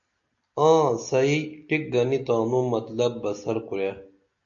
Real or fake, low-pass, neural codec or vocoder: real; 7.2 kHz; none